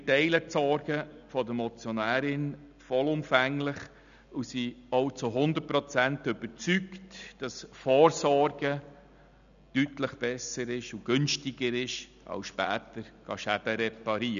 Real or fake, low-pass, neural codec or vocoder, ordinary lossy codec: real; 7.2 kHz; none; none